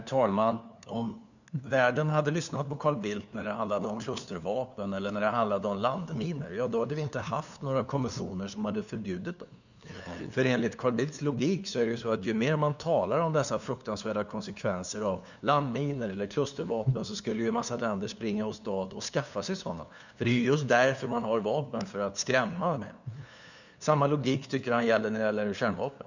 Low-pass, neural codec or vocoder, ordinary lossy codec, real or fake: 7.2 kHz; codec, 16 kHz, 2 kbps, FunCodec, trained on LibriTTS, 25 frames a second; none; fake